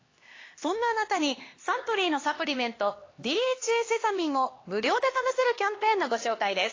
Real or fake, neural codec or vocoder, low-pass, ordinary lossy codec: fake; codec, 16 kHz, 2 kbps, X-Codec, HuBERT features, trained on LibriSpeech; 7.2 kHz; AAC, 32 kbps